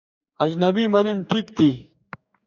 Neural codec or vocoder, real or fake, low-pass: codec, 44.1 kHz, 2.6 kbps, DAC; fake; 7.2 kHz